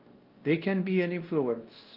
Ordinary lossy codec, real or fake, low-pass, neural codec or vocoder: Opus, 32 kbps; fake; 5.4 kHz; codec, 16 kHz, 1 kbps, X-Codec, WavLM features, trained on Multilingual LibriSpeech